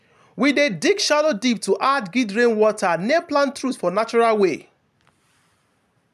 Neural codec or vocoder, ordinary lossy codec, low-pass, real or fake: none; none; 14.4 kHz; real